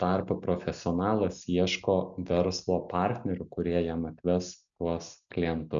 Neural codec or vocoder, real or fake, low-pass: none; real; 7.2 kHz